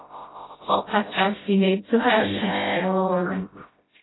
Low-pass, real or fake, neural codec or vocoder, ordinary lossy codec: 7.2 kHz; fake; codec, 16 kHz, 0.5 kbps, FreqCodec, smaller model; AAC, 16 kbps